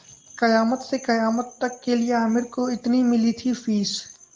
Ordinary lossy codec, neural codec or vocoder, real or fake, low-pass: Opus, 32 kbps; none; real; 7.2 kHz